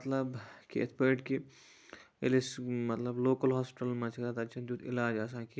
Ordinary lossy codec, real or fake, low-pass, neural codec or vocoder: none; real; none; none